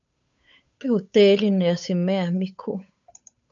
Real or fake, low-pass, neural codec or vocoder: fake; 7.2 kHz; codec, 16 kHz, 8 kbps, FunCodec, trained on Chinese and English, 25 frames a second